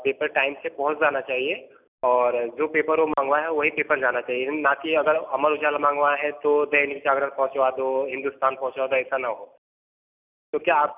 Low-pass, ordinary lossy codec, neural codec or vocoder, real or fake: 3.6 kHz; none; none; real